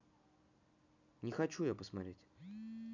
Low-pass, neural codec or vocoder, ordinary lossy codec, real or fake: 7.2 kHz; none; none; real